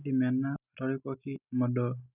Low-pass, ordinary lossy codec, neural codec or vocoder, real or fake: 3.6 kHz; none; none; real